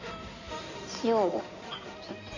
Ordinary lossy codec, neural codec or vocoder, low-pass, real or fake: none; codec, 16 kHz in and 24 kHz out, 2.2 kbps, FireRedTTS-2 codec; 7.2 kHz; fake